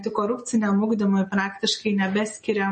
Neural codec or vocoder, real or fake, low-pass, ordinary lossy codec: none; real; 10.8 kHz; MP3, 32 kbps